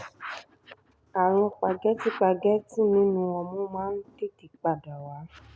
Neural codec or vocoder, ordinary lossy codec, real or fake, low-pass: none; none; real; none